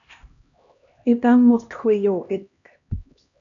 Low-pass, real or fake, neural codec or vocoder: 7.2 kHz; fake; codec, 16 kHz, 1 kbps, X-Codec, HuBERT features, trained on LibriSpeech